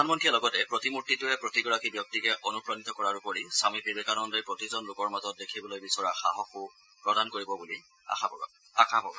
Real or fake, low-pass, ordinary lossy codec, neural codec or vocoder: real; none; none; none